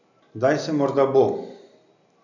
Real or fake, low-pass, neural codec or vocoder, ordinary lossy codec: real; 7.2 kHz; none; none